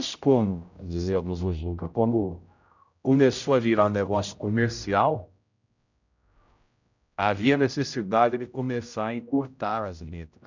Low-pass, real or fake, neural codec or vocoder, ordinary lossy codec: 7.2 kHz; fake; codec, 16 kHz, 0.5 kbps, X-Codec, HuBERT features, trained on general audio; none